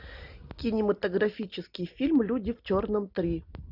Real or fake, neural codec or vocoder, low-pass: real; none; 5.4 kHz